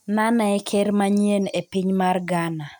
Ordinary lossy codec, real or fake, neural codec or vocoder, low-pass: none; real; none; 19.8 kHz